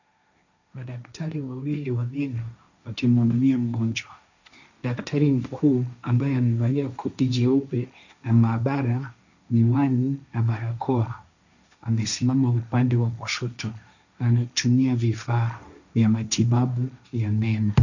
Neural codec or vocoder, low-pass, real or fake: codec, 16 kHz, 1.1 kbps, Voila-Tokenizer; 7.2 kHz; fake